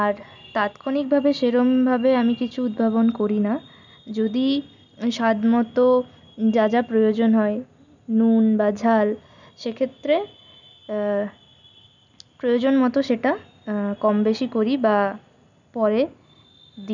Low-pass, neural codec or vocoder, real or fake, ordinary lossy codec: 7.2 kHz; none; real; none